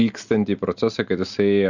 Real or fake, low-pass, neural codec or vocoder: real; 7.2 kHz; none